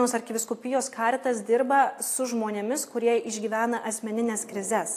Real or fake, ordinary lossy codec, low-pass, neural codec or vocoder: real; AAC, 64 kbps; 14.4 kHz; none